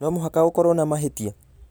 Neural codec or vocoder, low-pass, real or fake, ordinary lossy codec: none; none; real; none